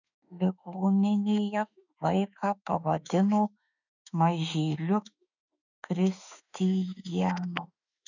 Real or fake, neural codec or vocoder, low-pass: fake; autoencoder, 48 kHz, 32 numbers a frame, DAC-VAE, trained on Japanese speech; 7.2 kHz